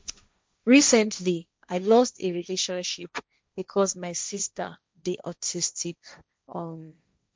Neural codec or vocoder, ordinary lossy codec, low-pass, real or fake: codec, 16 kHz, 1.1 kbps, Voila-Tokenizer; none; none; fake